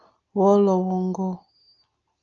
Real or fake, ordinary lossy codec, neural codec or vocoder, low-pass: real; Opus, 24 kbps; none; 7.2 kHz